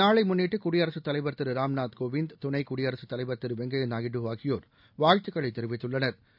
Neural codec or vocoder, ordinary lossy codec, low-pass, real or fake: none; none; 5.4 kHz; real